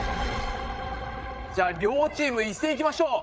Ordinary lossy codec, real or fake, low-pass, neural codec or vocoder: none; fake; none; codec, 16 kHz, 8 kbps, FreqCodec, larger model